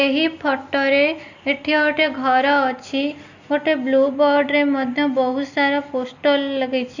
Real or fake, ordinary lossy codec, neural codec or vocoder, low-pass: real; none; none; 7.2 kHz